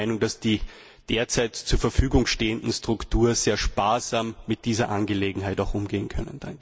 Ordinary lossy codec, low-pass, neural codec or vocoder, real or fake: none; none; none; real